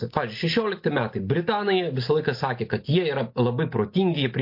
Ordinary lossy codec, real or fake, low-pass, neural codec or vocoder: MP3, 32 kbps; real; 5.4 kHz; none